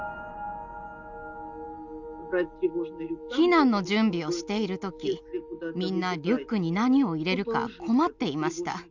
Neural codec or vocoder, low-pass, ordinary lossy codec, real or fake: none; 7.2 kHz; none; real